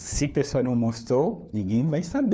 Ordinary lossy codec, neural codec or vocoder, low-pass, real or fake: none; codec, 16 kHz, 4 kbps, FunCodec, trained on LibriTTS, 50 frames a second; none; fake